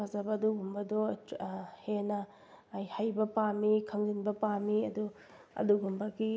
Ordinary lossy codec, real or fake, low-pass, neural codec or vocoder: none; real; none; none